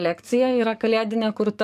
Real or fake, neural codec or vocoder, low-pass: fake; codec, 44.1 kHz, 7.8 kbps, DAC; 14.4 kHz